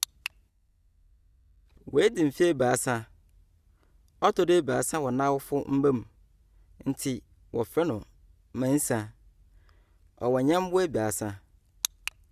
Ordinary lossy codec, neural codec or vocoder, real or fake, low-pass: Opus, 64 kbps; none; real; 14.4 kHz